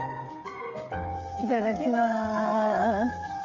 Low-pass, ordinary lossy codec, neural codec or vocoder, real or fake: 7.2 kHz; MP3, 64 kbps; codec, 16 kHz, 8 kbps, FreqCodec, smaller model; fake